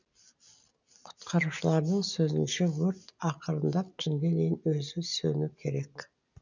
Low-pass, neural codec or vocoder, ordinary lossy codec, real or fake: 7.2 kHz; none; none; real